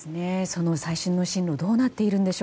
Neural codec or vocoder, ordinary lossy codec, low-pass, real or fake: none; none; none; real